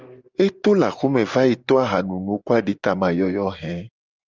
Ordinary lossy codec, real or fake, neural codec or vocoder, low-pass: Opus, 32 kbps; real; none; 7.2 kHz